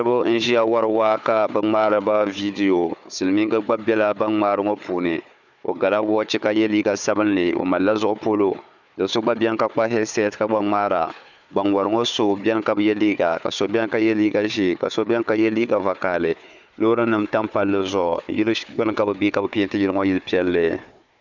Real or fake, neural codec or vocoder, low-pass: fake; codec, 16 kHz, 4 kbps, FunCodec, trained on Chinese and English, 50 frames a second; 7.2 kHz